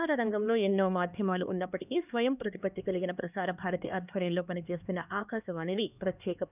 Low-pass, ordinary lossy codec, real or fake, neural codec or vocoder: 3.6 kHz; none; fake; codec, 16 kHz, 2 kbps, X-Codec, HuBERT features, trained on LibriSpeech